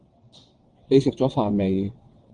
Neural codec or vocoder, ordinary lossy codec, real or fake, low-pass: vocoder, 22.05 kHz, 80 mel bands, WaveNeXt; Opus, 24 kbps; fake; 9.9 kHz